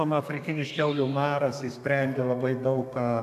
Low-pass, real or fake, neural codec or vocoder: 14.4 kHz; fake; codec, 32 kHz, 1.9 kbps, SNAC